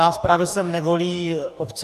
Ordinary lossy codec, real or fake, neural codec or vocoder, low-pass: AAC, 96 kbps; fake; codec, 44.1 kHz, 2.6 kbps, DAC; 14.4 kHz